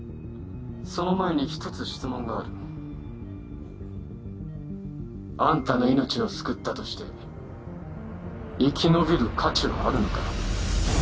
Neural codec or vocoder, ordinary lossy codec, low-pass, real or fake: none; none; none; real